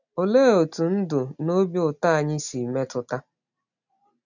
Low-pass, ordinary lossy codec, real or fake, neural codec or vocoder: 7.2 kHz; none; real; none